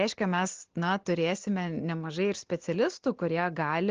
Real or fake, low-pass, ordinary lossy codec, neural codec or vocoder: real; 7.2 kHz; Opus, 16 kbps; none